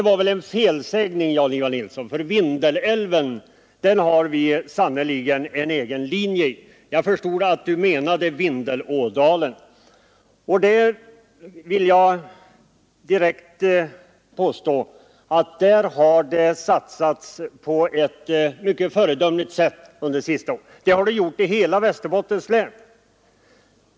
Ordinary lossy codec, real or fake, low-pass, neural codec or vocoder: none; real; none; none